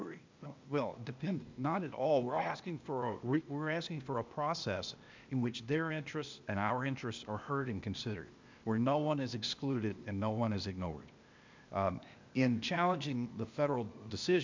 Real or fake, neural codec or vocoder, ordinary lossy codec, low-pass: fake; codec, 16 kHz, 0.8 kbps, ZipCodec; MP3, 64 kbps; 7.2 kHz